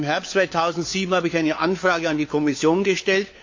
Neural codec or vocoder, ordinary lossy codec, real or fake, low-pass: codec, 16 kHz, 4 kbps, FunCodec, trained on LibriTTS, 50 frames a second; AAC, 48 kbps; fake; 7.2 kHz